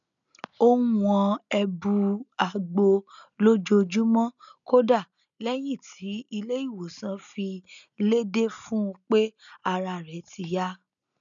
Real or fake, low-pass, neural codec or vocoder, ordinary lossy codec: real; 7.2 kHz; none; none